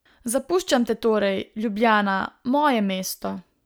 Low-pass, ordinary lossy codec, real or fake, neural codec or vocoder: none; none; real; none